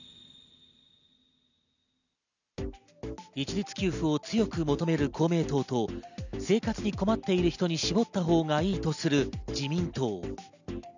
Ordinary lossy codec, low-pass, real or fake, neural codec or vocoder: none; 7.2 kHz; real; none